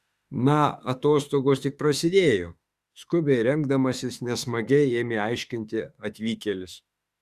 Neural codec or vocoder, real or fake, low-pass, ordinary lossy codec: autoencoder, 48 kHz, 32 numbers a frame, DAC-VAE, trained on Japanese speech; fake; 14.4 kHz; Opus, 64 kbps